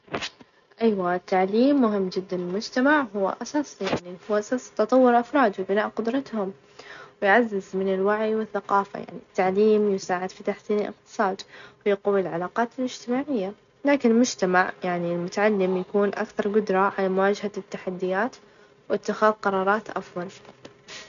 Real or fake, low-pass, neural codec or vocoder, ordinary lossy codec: real; 7.2 kHz; none; none